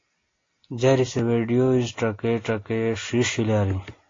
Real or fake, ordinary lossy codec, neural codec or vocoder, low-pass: real; AAC, 32 kbps; none; 7.2 kHz